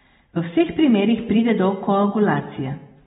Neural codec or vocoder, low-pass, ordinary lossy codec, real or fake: none; 7.2 kHz; AAC, 16 kbps; real